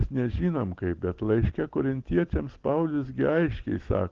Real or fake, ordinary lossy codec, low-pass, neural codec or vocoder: real; Opus, 16 kbps; 7.2 kHz; none